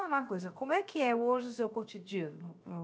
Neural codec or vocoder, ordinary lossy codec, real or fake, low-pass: codec, 16 kHz, 0.7 kbps, FocalCodec; none; fake; none